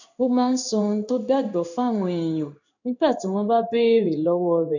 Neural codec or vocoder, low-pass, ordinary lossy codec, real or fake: codec, 16 kHz in and 24 kHz out, 1 kbps, XY-Tokenizer; 7.2 kHz; none; fake